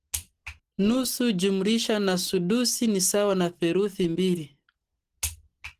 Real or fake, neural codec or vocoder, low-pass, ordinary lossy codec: real; none; 14.4 kHz; Opus, 16 kbps